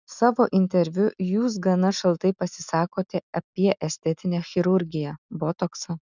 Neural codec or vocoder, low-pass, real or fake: none; 7.2 kHz; real